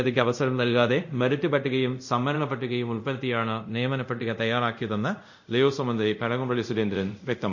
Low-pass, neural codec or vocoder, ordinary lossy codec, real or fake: 7.2 kHz; codec, 24 kHz, 0.5 kbps, DualCodec; none; fake